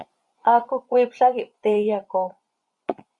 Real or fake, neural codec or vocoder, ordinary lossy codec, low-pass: fake; vocoder, 24 kHz, 100 mel bands, Vocos; Opus, 64 kbps; 10.8 kHz